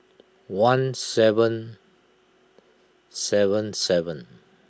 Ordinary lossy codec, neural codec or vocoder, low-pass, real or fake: none; none; none; real